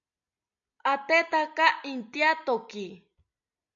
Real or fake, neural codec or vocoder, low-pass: real; none; 7.2 kHz